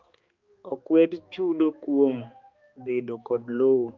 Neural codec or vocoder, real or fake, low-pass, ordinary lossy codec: codec, 16 kHz, 2 kbps, X-Codec, HuBERT features, trained on balanced general audio; fake; 7.2 kHz; Opus, 24 kbps